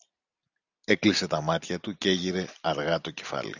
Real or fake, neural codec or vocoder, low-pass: real; none; 7.2 kHz